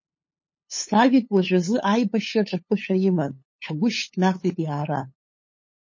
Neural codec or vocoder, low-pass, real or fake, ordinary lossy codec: codec, 16 kHz, 8 kbps, FunCodec, trained on LibriTTS, 25 frames a second; 7.2 kHz; fake; MP3, 32 kbps